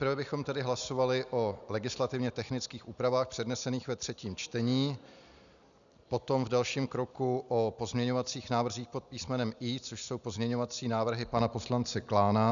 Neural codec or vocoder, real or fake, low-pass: none; real; 7.2 kHz